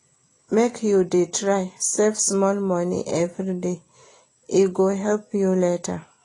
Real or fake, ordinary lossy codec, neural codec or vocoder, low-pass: real; AAC, 32 kbps; none; 10.8 kHz